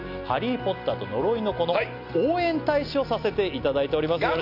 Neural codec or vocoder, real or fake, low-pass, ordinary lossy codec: none; real; 5.4 kHz; none